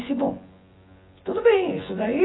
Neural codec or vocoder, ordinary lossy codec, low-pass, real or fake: vocoder, 24 kHz, 100 mel bands, Vocos; AAC, 16 kbps; 7.2 kHz; fake